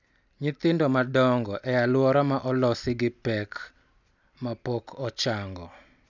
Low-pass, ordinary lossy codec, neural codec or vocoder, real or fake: 7.2 kHz; none; none; real